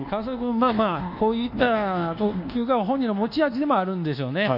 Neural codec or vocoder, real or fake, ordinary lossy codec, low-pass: codec, 24 kHz, 1.2 kbps, DualCodec; fake; none; 5.4 kHz